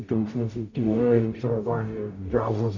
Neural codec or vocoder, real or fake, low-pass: codec, 44.1 kHz, 0.9 kbps, DAC; fake; 7.2 kHz